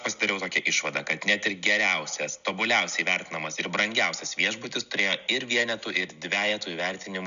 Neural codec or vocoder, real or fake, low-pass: none; real; 7.2 kHz